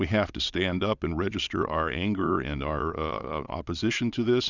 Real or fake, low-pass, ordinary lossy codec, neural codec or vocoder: fake; 7.2 kHz; Opus, 64 kbps; vocoder, 22.05 kHz, 80 mel bands, Vocos